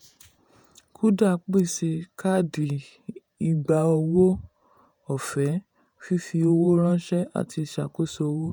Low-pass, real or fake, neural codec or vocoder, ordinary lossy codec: none; fake; vocoder, 48 kHz, 128 mel bands, Vocos; none